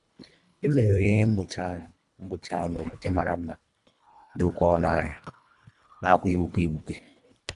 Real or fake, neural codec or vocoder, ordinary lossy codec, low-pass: fake; codec, 24 kHz, 1.5 kbps, HILCodec; none; 10.8 kHz